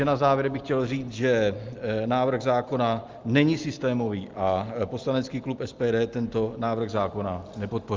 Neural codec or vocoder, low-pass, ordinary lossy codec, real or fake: none; 7.2 kHz; Opus, 32 kbps; real